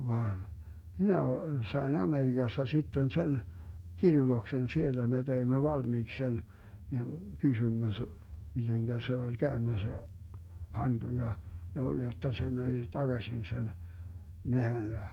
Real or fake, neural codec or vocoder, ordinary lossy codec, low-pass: fake; codec, 44.1 kHz, 2.6 kbps, DAC; none; 19.8 kHz